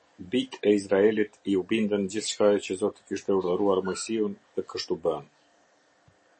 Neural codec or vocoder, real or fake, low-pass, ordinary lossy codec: none; real; 10.8 kHz; MP3, 32 kbps